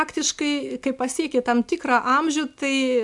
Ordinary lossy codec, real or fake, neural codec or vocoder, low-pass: MP3, 64 kbps; real; none; 10.8 kHz